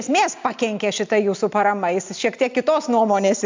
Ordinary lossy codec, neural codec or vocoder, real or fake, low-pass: MP3, 64 kbps; none; real; 7.2 kHz